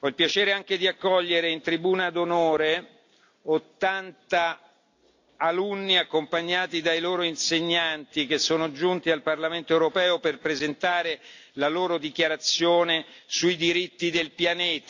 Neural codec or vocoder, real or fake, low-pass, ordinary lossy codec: none; real; 7.2 kHz; AAC, 48 kbps